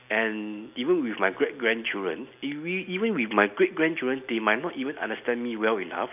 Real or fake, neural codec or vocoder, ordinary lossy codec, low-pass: real; none; none; 3.6 kHz